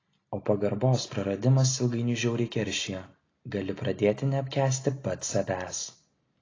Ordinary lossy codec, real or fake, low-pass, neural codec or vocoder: AAC, 32 kbps; real; 7.2 kHz; none